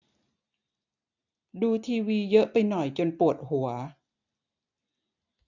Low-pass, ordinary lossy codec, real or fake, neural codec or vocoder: 7.2 kHz; none; real; none